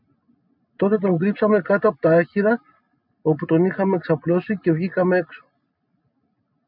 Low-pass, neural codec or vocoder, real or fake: 5.4 kHz; none; real